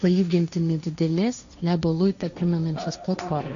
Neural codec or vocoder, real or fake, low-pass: codec, 16 kHz, 1.1 kbps, Voila-Tokenizer; fake; 7.2 kHz